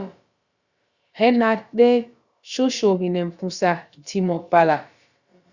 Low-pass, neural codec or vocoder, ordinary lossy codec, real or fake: 7.2 kHz; codec, 16 kHz, about 1 kbps, DyCAST, with the encoder's durations; Opus, 64 kbps; fake